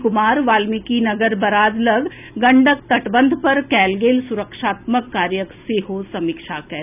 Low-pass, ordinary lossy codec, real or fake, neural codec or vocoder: 3.6 kHz; none; real; none